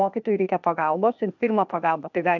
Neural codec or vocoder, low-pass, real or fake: codec, 16 kHz, 0.8 kbps, ZipCodec; 7.2 kHz; fake